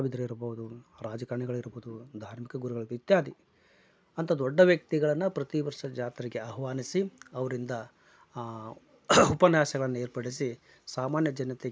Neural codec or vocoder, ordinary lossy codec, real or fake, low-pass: none; none; real; none